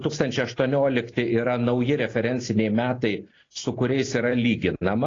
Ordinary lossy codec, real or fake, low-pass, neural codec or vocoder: AAC, 32 kbps; real; 7.2 kHz; none